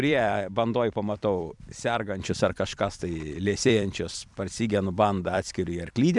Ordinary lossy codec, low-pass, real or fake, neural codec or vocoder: MP3, 96 kbps; 10.8 kHz; fake; vocoder, 44.1 kHz, 128 mel bands every 256 samples, BigVGAN v2